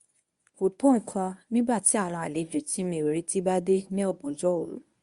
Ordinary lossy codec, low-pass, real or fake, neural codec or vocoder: Opus, 64 kbps; 10.8 kHz; fake; codec, 24 kHz, 0.9 kbps, WavTokenizer, medium speech release version 1